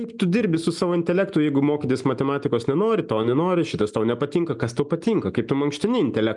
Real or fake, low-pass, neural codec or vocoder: real; 10.8 kHz; none